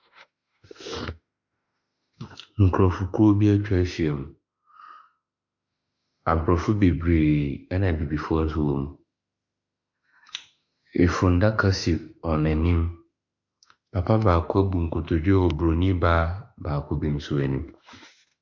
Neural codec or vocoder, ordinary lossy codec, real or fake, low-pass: autoencoder, 48 kHz, 32 numbers a frame, DAC-VAE, trained on Japanese speech; MP3, 64 kbps; fake; 7.2 kHz